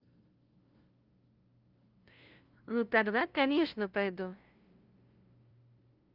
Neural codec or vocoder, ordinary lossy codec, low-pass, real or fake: codec, 16 kHz, 0.5 kbps, FunCodec, trained on LibriTTS, 25 frames a second; Opus, 24 kbps; 5.4 kHz; fake